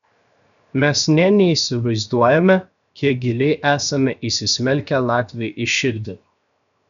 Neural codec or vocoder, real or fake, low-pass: codec, 16 kHz, 0.7 kbps, FocalCodec; fake; 7.2 kHz